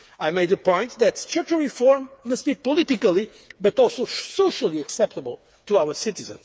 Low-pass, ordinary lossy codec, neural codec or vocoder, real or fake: none; none; codec, 16 kHz, 4 kbps, FreqCodec, smaller model; fake